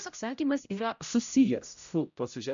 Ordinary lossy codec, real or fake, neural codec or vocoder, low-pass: MP3, 64 kbps; fake; codec, 16 kHz, 0.5 kbps, X-Codec, HuBERT features, trained on general audio; 7.2 kHz